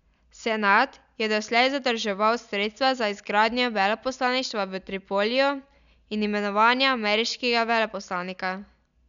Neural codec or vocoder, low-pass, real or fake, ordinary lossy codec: none; 7.2 kHz; real; none